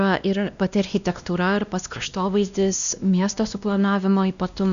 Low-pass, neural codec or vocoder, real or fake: 7.2 kHz; codec, 16 kHz, 1 kbps, X-Codec, WavLM features, trained on Multilingual LibriSpeech; fake